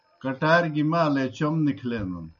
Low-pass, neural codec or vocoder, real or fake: 7.2 kHz; none; real